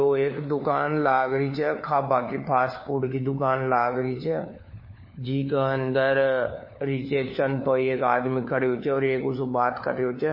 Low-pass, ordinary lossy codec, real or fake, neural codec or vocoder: 5.4 kHz; MP3, 24 kbps; fake; codec, 16 kHz, 4 kbps, FunCodec, trained on LibriTTS, 50 frames a second